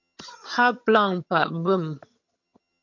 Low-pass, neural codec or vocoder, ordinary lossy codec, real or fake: 7.2 kHz; vocoder, 22.05 kHz, 80 mel bands, HiFi-GAN; MP3, 48 kbps; fake